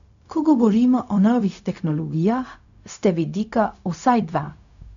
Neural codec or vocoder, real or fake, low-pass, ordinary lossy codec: codec, 16 kHz, 0.4 kbps, LongCat-Audio-Codec; fake; 7.2 kHz; none